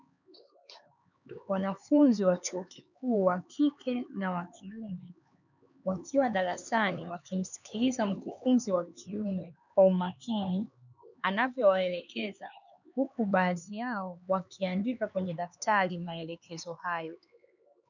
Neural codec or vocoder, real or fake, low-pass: codec, 16 kHz, 4 kbps, X-Codec, HuBERT features, trained on LibriSpeech; fake; 7.2 kHz